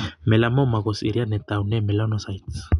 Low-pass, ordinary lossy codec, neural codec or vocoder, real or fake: 10.8 kHz; none; none; real